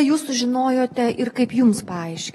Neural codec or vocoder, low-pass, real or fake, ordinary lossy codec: none; 19.8 kHz; real; AAC, 32 kbps